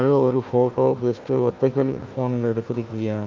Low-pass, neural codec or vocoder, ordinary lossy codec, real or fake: 7.2 kHz; codec, 16 kHz, 1 kbps, FunCodec, trained on Chinese and English, 50 frames a second; Opus, 24 kbps; fake